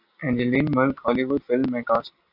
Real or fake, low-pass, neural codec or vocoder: real; 5.4 kHz; none